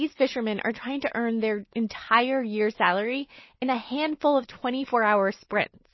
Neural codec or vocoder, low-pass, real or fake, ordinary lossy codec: none; 7.2 kHz; real; MP3, 24 kbps